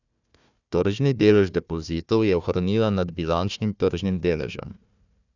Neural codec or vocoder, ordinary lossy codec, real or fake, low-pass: codec, 16 kHz, 1 kbps, FunCodec, trained on Chinese and English, 50 frames a second; none; fake; 7.2 kHz